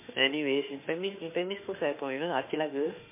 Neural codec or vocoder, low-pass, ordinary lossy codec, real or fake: autoencoder, 48 kHz, 32 numbers a frame, DAC-VAE, trained on Japanese speech; 3.6 kHz; MP3, 24 kbps; fake